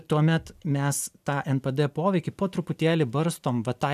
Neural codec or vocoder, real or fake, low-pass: none; real; 14.4 kHz